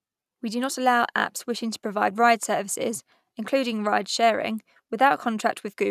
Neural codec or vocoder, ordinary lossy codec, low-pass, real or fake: none; none; 14.4 kHz; real